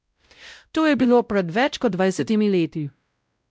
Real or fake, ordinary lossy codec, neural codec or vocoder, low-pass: fake; none; codec, 16 kHz, 0.5 kbps, X-Codec, WavLM features, trained on Multilingual LibriSpeech; none